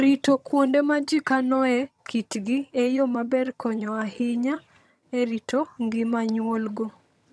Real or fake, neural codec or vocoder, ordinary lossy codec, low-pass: fake; vocoder, 22.05 kHz, 80 mel bands, HiFi-GAN; none; none